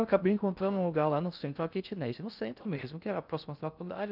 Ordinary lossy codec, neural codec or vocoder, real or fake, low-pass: none; codec, 16 kHz in and 24 kHz out, 0.6 kbps, FocalCodec, streaming, 4096 codes; fake; 5.4 kHz